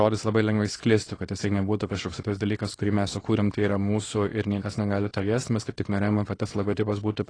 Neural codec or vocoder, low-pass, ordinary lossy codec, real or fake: codec, 24 kHz, 0.9 kbps, WavTokenizer, small release; 9.9 kHz; AAC, 32 kbps; fake